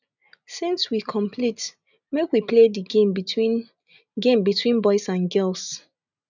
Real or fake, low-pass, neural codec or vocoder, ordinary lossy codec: real; 7.2 kHz; none; none